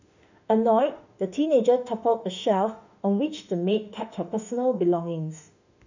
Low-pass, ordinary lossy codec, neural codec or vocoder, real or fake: 7.2 kHz; none; autoencoder, 48 kHz, 32 numbers a frame, DAC-VAE, trained on Japanese speech; fake